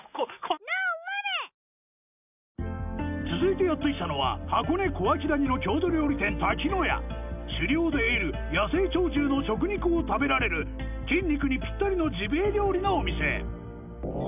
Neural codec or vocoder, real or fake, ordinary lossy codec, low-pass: none; real; none; 3.6 kHz